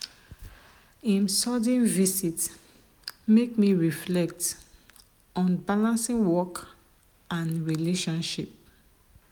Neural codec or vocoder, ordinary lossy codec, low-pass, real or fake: none; none; none; real